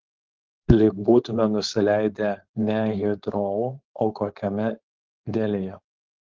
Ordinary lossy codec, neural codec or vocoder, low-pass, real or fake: Opus, 16 kbps; codec, 16 kHz, 4.8 kbps, FACodec; 7.2 kHz; fake